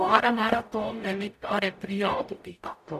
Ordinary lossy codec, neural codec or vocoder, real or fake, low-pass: none; codec, 44.1 kHz, 0.9 kbps, DAC; fake; 14.4 kHz